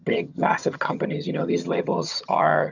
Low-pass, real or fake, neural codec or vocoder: 7.2 kHz; fake; vocoder, 22.05 kHz, 80 mel bands, HiFi-GAN